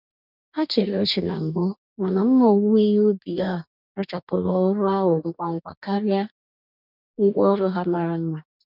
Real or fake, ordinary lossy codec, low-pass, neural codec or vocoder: fake; none; 5.4 kHz; codec, 44.1 kHz, 2.6 kbps, DAC